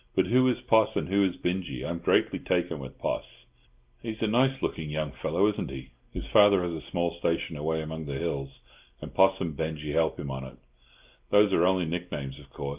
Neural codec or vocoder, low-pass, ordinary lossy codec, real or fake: none; 3.6 kHz; Opus, 32 kbps; real